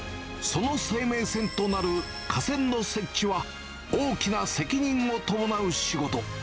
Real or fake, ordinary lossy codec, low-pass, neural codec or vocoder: real; none; none; none